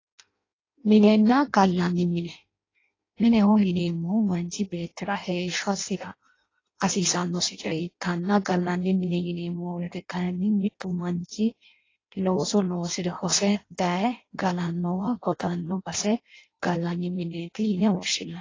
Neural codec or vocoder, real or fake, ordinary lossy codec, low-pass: codec, 16 kHz in and 24 kHz out, 0.6 kbps, FireRedTTS-2 codec; fake; AAC, 32 kbps; 7.2 kHz